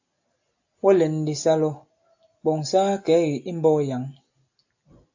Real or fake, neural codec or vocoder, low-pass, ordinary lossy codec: real; none; 7.2 kHz; AAC, 48 kbps